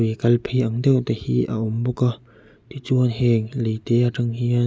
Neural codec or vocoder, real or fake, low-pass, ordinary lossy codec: none; real; none; none